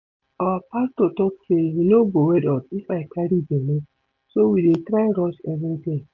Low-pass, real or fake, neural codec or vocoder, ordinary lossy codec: 7.2 kHz; real; none; MP3, 48 kbps